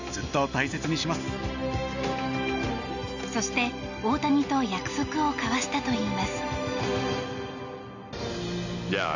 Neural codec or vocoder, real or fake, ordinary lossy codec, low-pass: none; real; none; 7.2 kHz